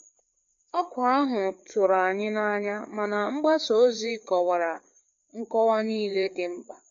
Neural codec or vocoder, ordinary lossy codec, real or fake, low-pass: codec, 16 kHz, 4 kbps, FreqCodec, larger model; MP3, 48 kbps; fake; 7.2 kHz